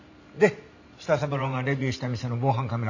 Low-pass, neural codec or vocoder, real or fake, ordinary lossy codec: 7.2 kHz; vocoder, 44.1 kHz, 128 mel bands every 512 samples, BigVGAN v2; fake; none